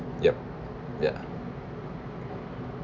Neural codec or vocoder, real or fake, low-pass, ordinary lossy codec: none; real; 7.2 kHz; none